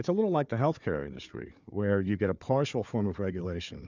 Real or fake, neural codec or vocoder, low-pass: fake; codec, 16 kHz, 4 kbps, FunCodec, trained on Chinese and English, 50 frames a second; 7.2 kHz